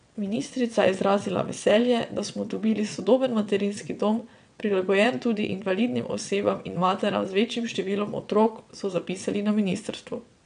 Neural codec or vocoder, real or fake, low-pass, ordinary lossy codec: vocoder, 22.05 kHz, 80 mel bands, WaveNeXt; fake; 9.9 kHz; none